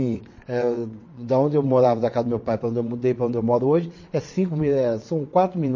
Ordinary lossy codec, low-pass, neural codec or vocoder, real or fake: MP3, 32 kbps; 7.2 kHz; vocoder, 22.05 kHz, 80 mel bands, WaveNeXt; fake